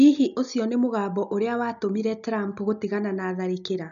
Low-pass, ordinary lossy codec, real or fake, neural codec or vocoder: 7.2 kHz; none; real; none